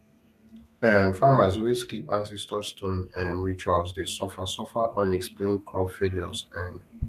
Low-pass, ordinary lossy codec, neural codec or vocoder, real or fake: 14.4 kHz; none; codec, 32 kHz, 1.9 kbps, SNAC; fake